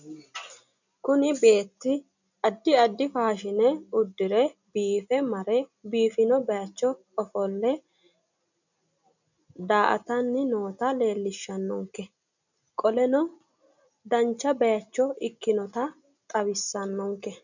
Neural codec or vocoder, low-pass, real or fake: none; 7.2 kHz; real